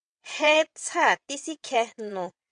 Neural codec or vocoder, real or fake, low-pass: vocoder, 22.05 kHz, 80 mel bands, WaveNeXt; fake; 9.9 kHz